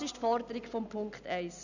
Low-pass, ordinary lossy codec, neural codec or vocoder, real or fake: 7.2 kHz; none; none; real